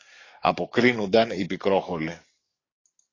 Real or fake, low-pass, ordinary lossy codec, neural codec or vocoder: fake; 7.2 kHz; AAC, 32 kbps; codec, 44.1 kHz, 7.8 kbps, DAC